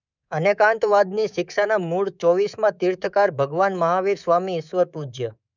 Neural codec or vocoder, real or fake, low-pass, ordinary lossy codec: codec, 24 kHz, 3.1 kbps, DualCodec; fake; 7.2 kHz; none